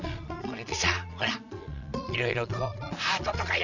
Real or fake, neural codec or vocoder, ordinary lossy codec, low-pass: fake; vocoder, 22.05 kHz, 80 mel bands, Vocos; none; 7.2 kHz